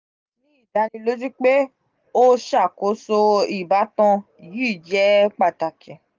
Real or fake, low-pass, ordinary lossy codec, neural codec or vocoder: real; 7.2 kHz; Opus, 24 kbps; none